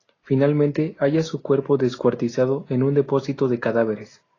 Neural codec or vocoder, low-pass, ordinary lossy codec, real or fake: none; 7.2 kHz; AAC, 32 kbps; real